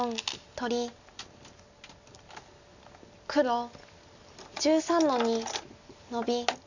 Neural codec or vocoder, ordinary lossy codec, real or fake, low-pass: none; none; real; 7.2 kHz